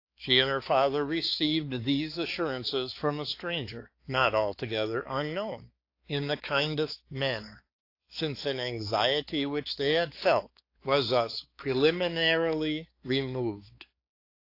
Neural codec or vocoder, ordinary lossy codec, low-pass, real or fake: codec, 16 kHz, 4 kbps, X-Codec, HuBERT features, trained on balanced general audio; AAC, 32 kbps; 5.4 kHz; fake